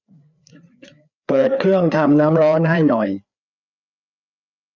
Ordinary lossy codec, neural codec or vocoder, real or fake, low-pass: none; codec, 16 kHz, 4 kbps, FreqCodec, larger model; fake; 7.2 kHz